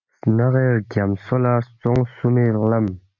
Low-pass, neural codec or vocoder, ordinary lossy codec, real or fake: 7.2 kHz; none; MP3, 64 kbps; real